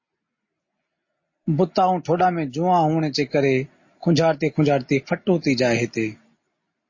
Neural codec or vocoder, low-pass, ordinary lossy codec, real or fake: none; 7.2 kHz; MP3, 32 kbps; real